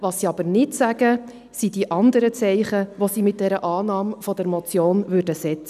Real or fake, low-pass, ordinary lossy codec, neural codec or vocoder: real; 14.4 kHz; none; none